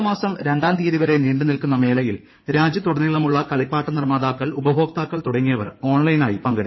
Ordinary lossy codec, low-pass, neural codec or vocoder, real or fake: MP3, 24 kbps; 7.2 kHz; codec, 16 kHz in and 24 kHz out, 2.2 kbps, FireRedTTS-2 codec; fake